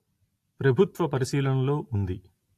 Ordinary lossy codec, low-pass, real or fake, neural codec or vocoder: AAC, 64 kbps; 14.4 kHz; real; none